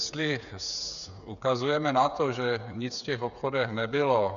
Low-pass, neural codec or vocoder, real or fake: 7.2 kHz; codec, 16 kHz, 8 kbps, FreqCodec, smaller model; fake